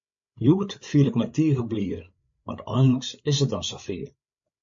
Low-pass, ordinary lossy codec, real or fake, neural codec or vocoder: 7.2 kHz; MP3, 48 kbps; fake; codec, 16 kHz, 8 kbps, FreqCodec, larger model